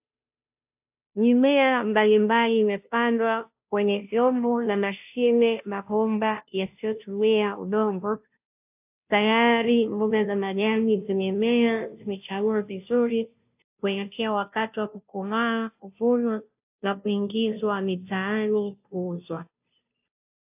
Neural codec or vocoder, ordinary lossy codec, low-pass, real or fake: codec, 16 kHz, 0.5 kbps, FunCodec, trained on Chinese and English, 25 frames a second; AAC, 32 kbps; 3.6 kHz; fake